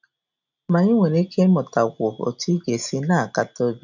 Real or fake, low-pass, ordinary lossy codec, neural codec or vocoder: real; 7.2 kHz; none; none